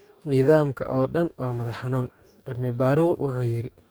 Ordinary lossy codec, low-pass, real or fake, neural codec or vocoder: none; none; fake; codec, 44.1 kHz, 2.6 kbps, DAC